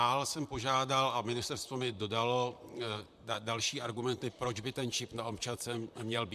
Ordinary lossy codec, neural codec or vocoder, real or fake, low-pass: MP3, 96 kbps; vocoder, 44.1 kHz, 128 mel bands, Pupu-Vocoder; fake; 14.4 kHz